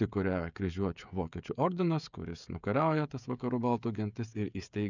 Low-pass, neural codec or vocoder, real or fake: 7.2 kHz; codec, 16 kHz, 16 kbps, FreqCodec, smaller model; fake